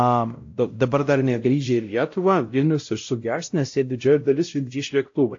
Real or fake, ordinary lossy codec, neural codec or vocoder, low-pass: fake; AAC, 48 kbps; codec, 16 kHz, 0.5 kbps, X-Codec, WavLM features, trained on Multilingual LibriSpeech; 7.2 kHz